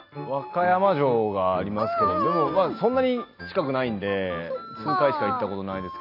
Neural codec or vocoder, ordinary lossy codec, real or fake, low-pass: none; AAC, 32 kbps; real; 5.4 kHz